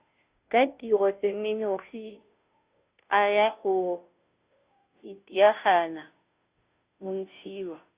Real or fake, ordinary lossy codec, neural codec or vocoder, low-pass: fake; Opus, 64 kbps; codec, 16 kHz, 0.5 kbps, FunCodec, trained on Chinese and English, 25 frames a second; 3.6 kHz